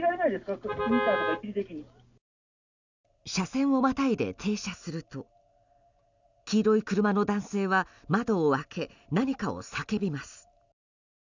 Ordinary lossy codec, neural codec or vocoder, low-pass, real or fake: none; none; 7.2 kHz; real